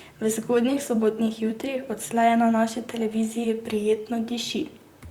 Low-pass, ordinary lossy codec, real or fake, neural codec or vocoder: 19.8 kHz; Opus, 64 kbps; fake; vocoder, 44.1 kHz, 128 mel bands, Pupu-Vocoder